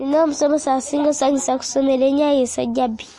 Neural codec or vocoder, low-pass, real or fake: none; 10.8 kHz; real